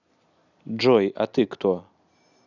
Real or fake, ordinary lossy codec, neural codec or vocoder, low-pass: real; none; none; 7.2 kHz